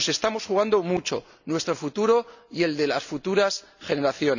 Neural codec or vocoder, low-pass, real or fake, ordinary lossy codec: none; 7.2 kHz; real; none